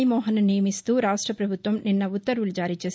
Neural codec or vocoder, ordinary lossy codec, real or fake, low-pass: none; none; real; none